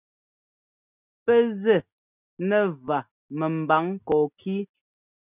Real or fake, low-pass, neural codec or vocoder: real; 3.6 kHz; none